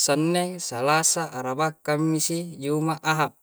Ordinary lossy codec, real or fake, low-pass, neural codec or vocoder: none; real; none; none